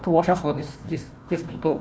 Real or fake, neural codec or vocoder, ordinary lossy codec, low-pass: fake; codec, 16 kHz, 1 kbps, FunCodec, trained on Chinese and English, 50 frames a second; none; none